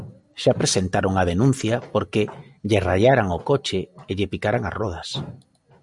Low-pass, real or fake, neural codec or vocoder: 10.8 kHz; real; none